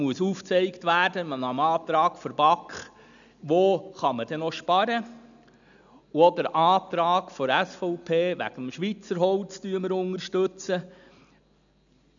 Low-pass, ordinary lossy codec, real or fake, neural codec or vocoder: 7.2 kHz; none; real; none